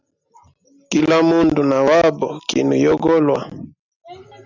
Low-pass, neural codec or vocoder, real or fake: 7.2 kHz; none; real